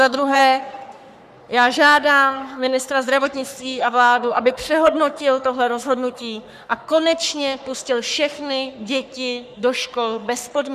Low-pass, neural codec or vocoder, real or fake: 14.4 kHz; codec, 44.1 kHz, 3.4 kbps, Pupu-Codec; fake